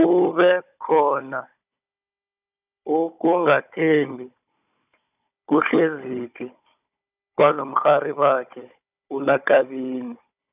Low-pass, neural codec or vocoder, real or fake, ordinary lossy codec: 3.6 kHz; codec, 16 kHz, 16 kbps, FunCodec, trained on Chinese and English, 50 frames a second; fake; none